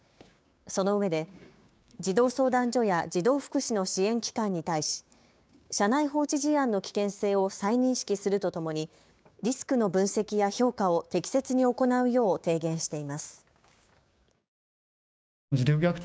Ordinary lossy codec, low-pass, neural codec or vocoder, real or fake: none; none; codec, 16 kHz, 6 kbps, DAC; fake